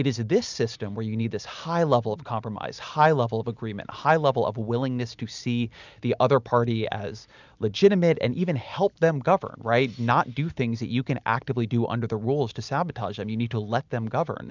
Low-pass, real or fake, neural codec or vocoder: 7.2 kHz; real; none